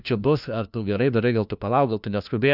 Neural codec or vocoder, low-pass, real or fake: codec, 16 kHz, 1 kbps, FunCodec, trained on LibriTTS, 50 frames a second; 5.4 kHz; fake